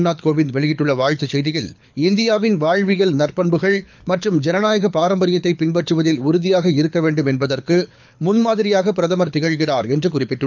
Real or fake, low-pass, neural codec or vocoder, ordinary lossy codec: fake; 7.2 kHz; codec, 24 kHz, 6 kbps, HILCodec; none